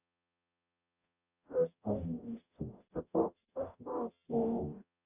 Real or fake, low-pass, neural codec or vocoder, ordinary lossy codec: fake; 3.6 kHz; codec, 44.1 kHz, 0.9 kbps, DAC; none